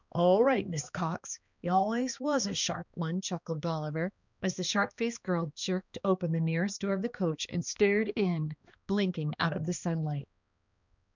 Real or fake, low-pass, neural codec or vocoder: fake; 7.2 kHz; codec, 16 kHz, 2 kbps, X-Codec, HuBERT features, trained on balanced general audio